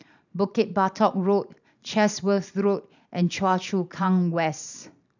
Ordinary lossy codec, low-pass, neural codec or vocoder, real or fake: none; 7.2 kHz; vocoder, 44.1 kHz, 128 mel bands every 512 samples, BigVGAN v2; fake